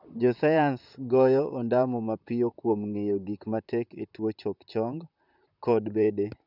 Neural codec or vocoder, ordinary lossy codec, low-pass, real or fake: vocoder, 44.1 kHz, 128 mel bands, Pupu-Vocoder; none; 5.4 kHz; fake